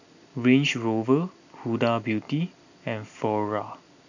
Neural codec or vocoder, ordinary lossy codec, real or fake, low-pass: none; none; real; 7.2 kHz